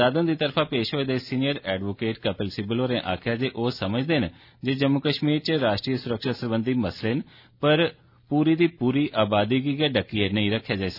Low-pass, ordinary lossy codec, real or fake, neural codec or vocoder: 5.4 kHz; none; real; none